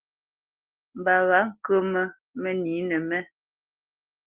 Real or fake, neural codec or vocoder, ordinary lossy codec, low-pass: real; none; Opus, 16 kbps; 3.6 kHz